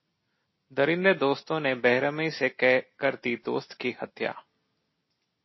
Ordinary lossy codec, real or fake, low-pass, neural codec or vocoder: MP3, 24 kbps; real; 7.2 kHz; none